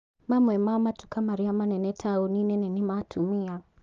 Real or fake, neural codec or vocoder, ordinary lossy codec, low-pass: real; none; Opus, 24 kbps; 7.2 kHz